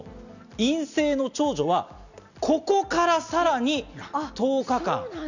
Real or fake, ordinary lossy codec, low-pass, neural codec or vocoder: real; none; 7.2 kHz; none